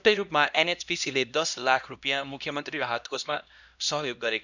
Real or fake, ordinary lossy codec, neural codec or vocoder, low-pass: fake; none; codec, 16 kHz, 1 kbps, X-Codec, HuBERT features, trained on LibriSpeech; 7.2 kHz